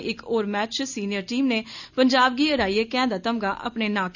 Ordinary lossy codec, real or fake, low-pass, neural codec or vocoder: none; real; 7.2 kHz; none